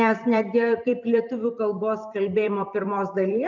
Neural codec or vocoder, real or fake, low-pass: vocoder, 44.1 kHz, 128 mel bands every 256 samples, BigVGAN v2; fake; 7.2 kHz